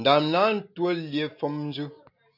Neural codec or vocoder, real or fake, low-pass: none; real; 5.4 kHz